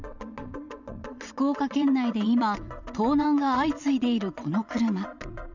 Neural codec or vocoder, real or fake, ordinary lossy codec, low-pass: vocoder, 22.05 kHz, 80 mel bands, WaveNeXt; fake; none; 7.2 kHz